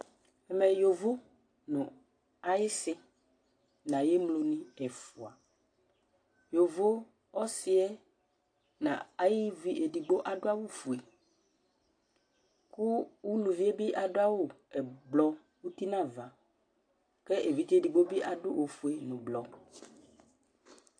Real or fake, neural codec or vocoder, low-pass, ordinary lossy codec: real; none; 9.9 kHz; AAC, 48 kbps